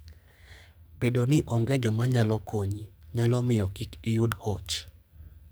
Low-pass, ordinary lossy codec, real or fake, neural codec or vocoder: none; none; fake; codec, 44.1 kHz, 2.6 kbps, SNAC